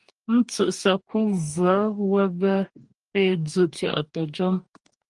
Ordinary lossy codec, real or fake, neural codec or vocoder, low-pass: Opus, 32 kbps; fake; codec, 44.1 kHz, 2.6 kbps, DAC; 10.8 kHz